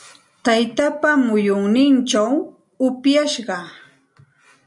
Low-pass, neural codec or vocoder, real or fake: 10.8 kHz; none; real